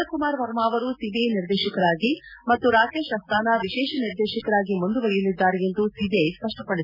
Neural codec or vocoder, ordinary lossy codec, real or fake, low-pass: none; none; real; 5.4 kHz